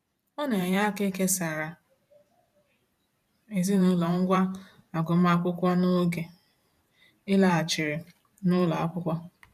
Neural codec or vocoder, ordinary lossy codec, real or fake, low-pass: vocoder, 44.1 kHz, 128 mel bands every 512 samples, BigVGAN v2; none; fake; 14.4 kHz